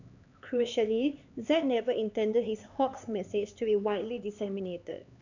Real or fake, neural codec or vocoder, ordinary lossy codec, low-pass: fake; codec, 16 kHz, 4 kbps, X-Codec, HuBERT features, trained on LibriSpeech; none; 7.2 kHz